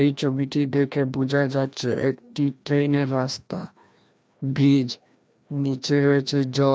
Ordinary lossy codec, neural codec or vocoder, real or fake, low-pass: none; codec, 16 kHz, 1 kbps, FreqCodec, larger model; fake; none